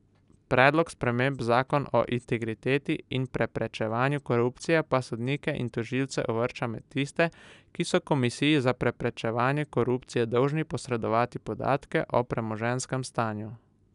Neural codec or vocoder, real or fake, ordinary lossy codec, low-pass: none; real; none; 10.8 kHz